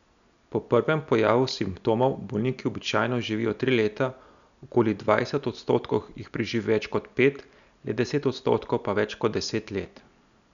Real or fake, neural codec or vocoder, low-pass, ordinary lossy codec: real; none; 7.2 kHz; none